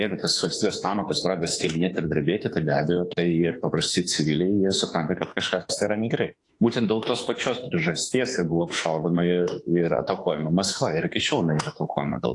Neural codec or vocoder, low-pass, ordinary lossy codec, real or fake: autoencoder, 48 kHz, 32 numbers a frame, DAC-VAE, trained on Japanese speech; 10.8 kHz; AAC, 48 kbps; fake